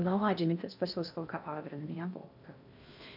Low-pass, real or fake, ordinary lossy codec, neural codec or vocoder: 5.4 kHz; fake; none; codec, 16 kHz in and 24 kHz out, 0.6 kbps, FocalCodec, streaming, 4096 codes